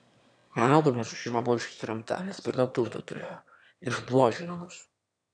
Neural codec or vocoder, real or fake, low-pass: autoencoder, 22.05 kHz, a latent of 192 numbers a frame, VITS, trained on one speaker; fake; 9.9 kHz